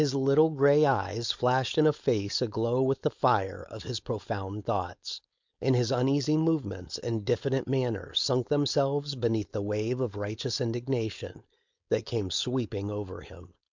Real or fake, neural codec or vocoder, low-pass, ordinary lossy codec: fake; codec, 16 kHz, 4.8 kbps, FACodec; 7.2 kHz; MP3, 64 kbps